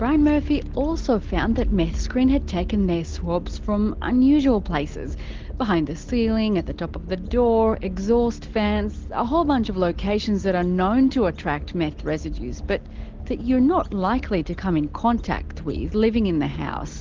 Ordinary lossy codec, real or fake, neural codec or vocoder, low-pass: Opus, 16 kbps; real; none; 7.2 kHz